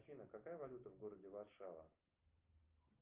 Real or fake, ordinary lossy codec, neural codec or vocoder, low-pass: real; Opus, 24 kbps; none; 3.6 kHz